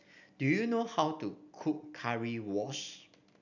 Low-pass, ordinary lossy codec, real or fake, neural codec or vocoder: 7.2 kHz; none; real; none